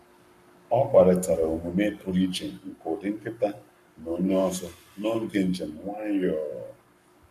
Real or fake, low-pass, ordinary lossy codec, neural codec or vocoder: fake; 14.4 kHz; none; codec, 44.1 kHz, 7.8 kbps, Pupu-Codec